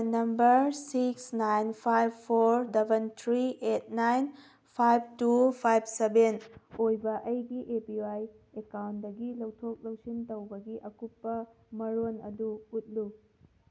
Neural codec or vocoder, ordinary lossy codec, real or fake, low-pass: none; none; real; none